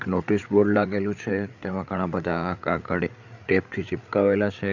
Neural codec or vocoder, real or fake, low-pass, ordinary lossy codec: codec, 16 kHz, 8 kbps, FreqCodec, larger model; fake; 7.2 kHz; none